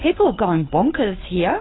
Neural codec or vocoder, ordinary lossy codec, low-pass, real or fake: codec, 16 kHz, 8 kbps, FreqCodec, larger model; AAC, 16 kbps; 7.2 kHz; fake